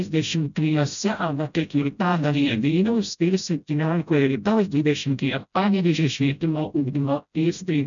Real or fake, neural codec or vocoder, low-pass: fake; codec, 16 kHz, 0.5 kbps, FreqCodec, smaller model; 7.2 kHz